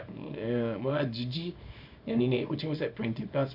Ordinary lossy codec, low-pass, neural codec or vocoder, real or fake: none; 5.4 kHz; codec, 24 kHz, 0.9 kbps, WavTokenizer, small release; fake